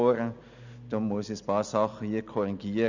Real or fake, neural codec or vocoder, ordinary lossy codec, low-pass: real; none; none; 7.2 kHz